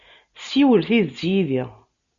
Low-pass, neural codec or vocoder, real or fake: 7.2 kHz; none; real